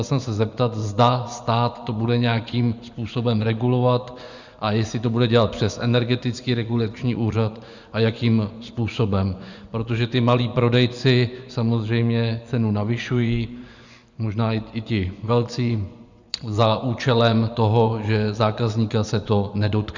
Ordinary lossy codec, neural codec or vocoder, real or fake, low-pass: Opus, 64 kbps; vocoder, 24 kHz, 100 mel bands, Vocos; fake; 7.2 kHz